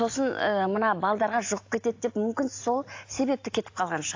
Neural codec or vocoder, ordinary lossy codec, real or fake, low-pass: none; AAC, 48 kbps; real; 7.2 kHz